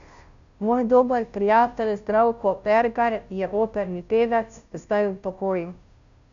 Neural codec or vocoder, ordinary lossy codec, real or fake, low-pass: codec, 16 kHz, 0.5 kbps, FunCodec, trained on Chinese and English, 25 frames a second; none; fake; 7.2 kHz